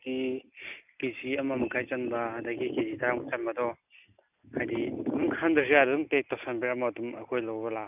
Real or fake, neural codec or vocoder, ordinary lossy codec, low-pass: real; none; none; 3.6 kHz